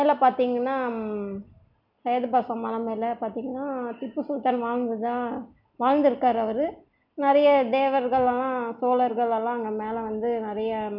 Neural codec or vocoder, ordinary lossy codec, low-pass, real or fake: none; none; 5.4 kHz; real